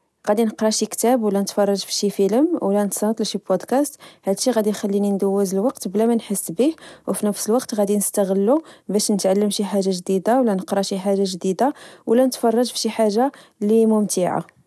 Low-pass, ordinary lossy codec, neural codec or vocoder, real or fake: none; none; none; real